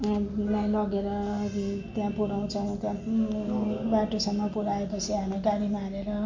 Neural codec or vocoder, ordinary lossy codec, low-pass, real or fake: none; MP3, 48 kbps; 7.2 kHz; real